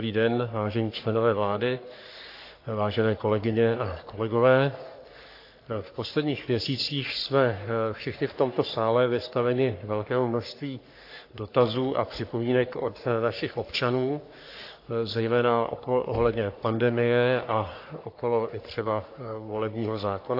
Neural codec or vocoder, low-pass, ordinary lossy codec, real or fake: codec, 44.1 kHz, 3.4 kbps, Pupu-Codec; 5.4 kHz; AAC, 32 kbps; fake